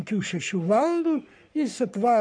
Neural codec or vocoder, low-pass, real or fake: codec, 44.1 kHz, 3.4 kbps, Pupu-Codec; 9.9 kHz; fake